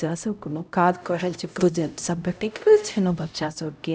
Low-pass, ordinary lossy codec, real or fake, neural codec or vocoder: none; none; fake; codec, 16 kHz, 0.5 kbps, X-Codec, HuBERT features, trained on LibriSpeech